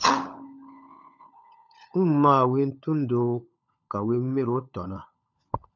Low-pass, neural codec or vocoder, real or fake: 7.2 kHz; codec, 16 kHz, 16 kbps, FunCodec, trained on LibriTTS, 50 frames a second; fake